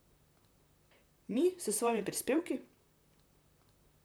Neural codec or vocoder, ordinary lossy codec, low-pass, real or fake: vocoder, 44.1 kHz, 128 mel bands, Pupu-Vocoder; none; none; fake